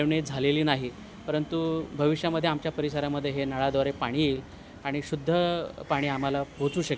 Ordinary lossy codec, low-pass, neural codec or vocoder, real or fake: none; none; none; real